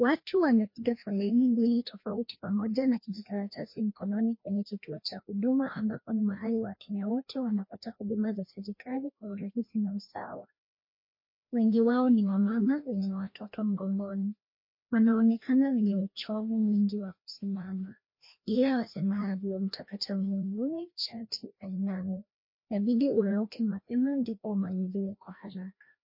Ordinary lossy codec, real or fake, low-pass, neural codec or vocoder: MP3, 32 kbps; fake; 5.4 kHz; codec, 16 kHz, 1 kbps, FreqCodec, larger model